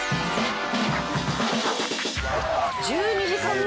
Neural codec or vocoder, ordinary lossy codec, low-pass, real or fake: none; none; none; real